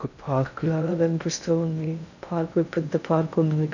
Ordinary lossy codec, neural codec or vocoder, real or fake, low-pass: none; codec, 16 kHz in and 24 kHz out, 0.6 kbps, FocalCodec, streaming, 2048 codes; fake; 7.2 kHz